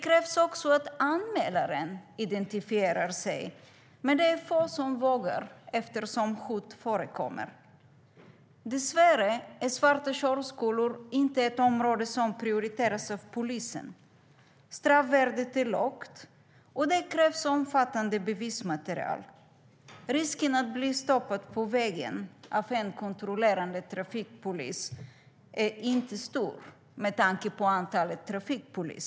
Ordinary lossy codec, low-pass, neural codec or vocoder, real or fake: none; none; none; real